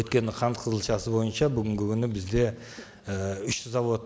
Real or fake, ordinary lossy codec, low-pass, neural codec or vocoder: real; none; none; none